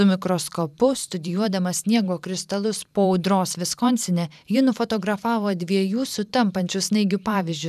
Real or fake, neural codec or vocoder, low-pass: fake; vocoder, 44.1 kHz, 128 mel bands every 512 samples, BigVGAN v2; 14.4 kHz